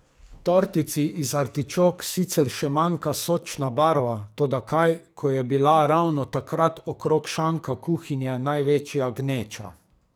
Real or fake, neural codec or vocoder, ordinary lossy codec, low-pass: fake; codec, 44.1 kHz, 2.6 kbps, SNAC; none; none